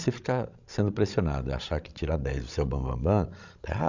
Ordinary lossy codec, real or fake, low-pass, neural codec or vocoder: none; fake; 7.2 kHz; codec, 16 kHz, 16 kbps, FreqCodec, larger model